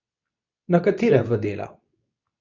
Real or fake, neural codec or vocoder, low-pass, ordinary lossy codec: fake; codec, 24 kHz, 0.9 kbps, WavTokenizer, medium speech release version 2; 7.2 kHz; none